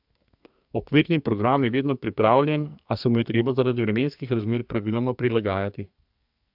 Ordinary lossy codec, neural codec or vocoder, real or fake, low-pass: AAC, 48 kbps; codec, 44.1 kHz, 2.6 kbps, SNAC; fake; 5.4 kHz